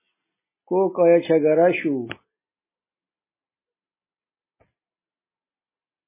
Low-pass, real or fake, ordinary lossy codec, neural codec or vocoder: 3.6 kHz; real; MP3, 16 kbps; none